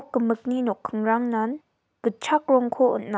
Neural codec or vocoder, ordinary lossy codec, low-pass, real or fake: none; none; none; real